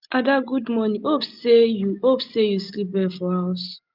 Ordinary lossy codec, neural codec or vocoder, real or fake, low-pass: Opus, 24 kbps; none; real; 5.4 kHz